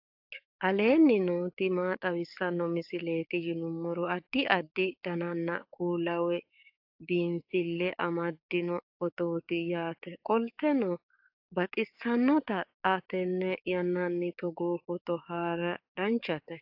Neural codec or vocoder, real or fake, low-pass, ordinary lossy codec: codec, 44.1 kHz, 7.8 kbps, DAC; fake; 5.4 kHz; AAC, 48 kbps